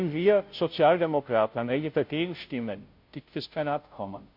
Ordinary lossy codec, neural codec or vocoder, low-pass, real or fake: MP3, 48 kbps; codec, 16 kHz, 0.5 kbps, FunCodec, trained on Chinese and English, 25 frames a second; 5.4 kHz; fake